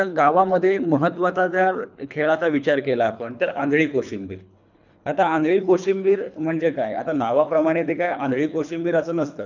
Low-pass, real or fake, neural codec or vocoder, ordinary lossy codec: 7.2 kHz; fake; codec, 24 kHz, 3 kbps, HILCodec; none